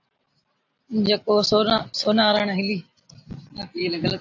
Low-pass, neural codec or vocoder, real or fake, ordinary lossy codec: 7.2 kHz; none; real; AAC, 48 kbps